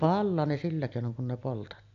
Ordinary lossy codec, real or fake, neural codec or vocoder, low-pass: AAC, 64 kbps; real; none; 7.2 kHz